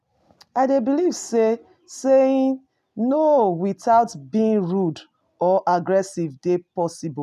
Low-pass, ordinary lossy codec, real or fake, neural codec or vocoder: 14.4 kHz; none; real; none